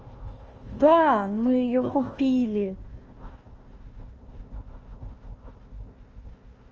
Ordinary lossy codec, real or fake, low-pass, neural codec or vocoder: Opus, 24 kbps; fake; 7.2 kHz; codec, 16 kHz, 1 kbps, FunCodec, trained on Chinese and English, 50 frames a second